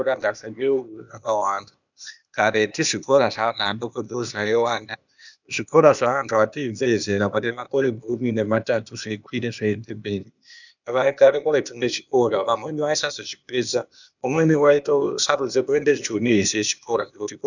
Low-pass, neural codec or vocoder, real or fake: 7.2 kHz; codec, 16 kHz, 0.8 kbps, ZipCodec; fake